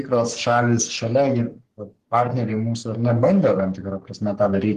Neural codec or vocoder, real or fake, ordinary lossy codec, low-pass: codec, 44.1 kHz, 3.4 kbps, Pupu-Codec; fake; Opus, 16 kbps; 14.4 kHz